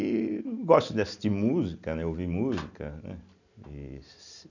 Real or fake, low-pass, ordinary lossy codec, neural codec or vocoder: real; 7.2 kHz; none; none